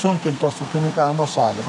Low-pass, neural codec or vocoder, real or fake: 10.8 kHz; codec, 32 kHz, 1.9 kbps, SNAC; fake